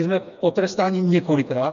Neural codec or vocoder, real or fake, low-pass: codec, 16 kHz, 2 kbps, FreqCodec, smaller model; fake; 7.2 kHz